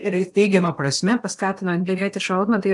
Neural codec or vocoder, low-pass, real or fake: codec, 16 kHz in and 24 kHz out, 0.8 kbps, FocalCodec, streaming, 65536 codes; 10.8 kHz; fake